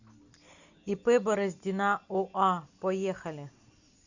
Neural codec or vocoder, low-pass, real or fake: none; 7.2 kHz; real